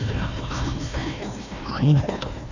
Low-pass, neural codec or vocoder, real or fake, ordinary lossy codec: 7.2 kHz; codec, 16 kHz, 1 kbps, FunCodec, trained on Chinese and English, 50 frames a second; fake; none